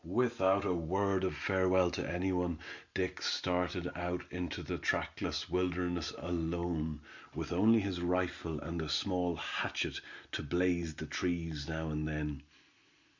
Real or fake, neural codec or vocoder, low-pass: real; none; 7.2 kHz